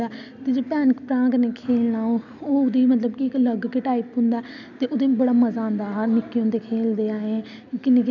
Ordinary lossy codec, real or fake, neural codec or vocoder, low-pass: none; real; none; 7.2 kHz